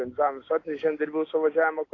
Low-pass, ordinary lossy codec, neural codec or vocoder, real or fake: 7.2 kHz; AAC, 32 kbps; none; real